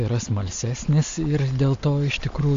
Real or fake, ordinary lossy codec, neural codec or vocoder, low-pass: real; MP3, 48 kbps; none; 7.2 kHz